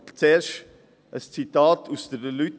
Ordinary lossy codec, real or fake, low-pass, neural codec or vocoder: none; real; none; none